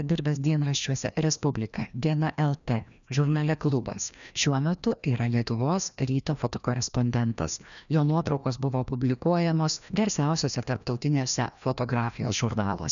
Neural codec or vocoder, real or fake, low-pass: codec, 16 kHz, 1 kbps, FreqCodec, larger model; fake; 7.2 kHz